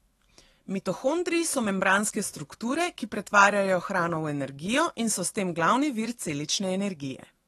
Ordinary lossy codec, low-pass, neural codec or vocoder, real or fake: AAC, 32 kbps; 19.8 kHz; autoencoder, 48 kHz, 128 numbers a frame, DAC-VAE, trained on Japanese speech; fake